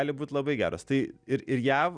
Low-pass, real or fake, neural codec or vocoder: 9.9 kHz; real; none